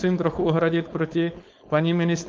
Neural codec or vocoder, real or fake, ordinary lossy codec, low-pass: codec, 16 kHz, 4.8 kbps, FACodec; fake; Opus, 32 kbps; 7.2 kHz